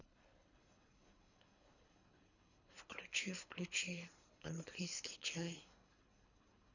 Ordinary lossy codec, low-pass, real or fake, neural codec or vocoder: none; 7.2 kHz; fake; codec, 24 kHz, 3 kbps, HILCodec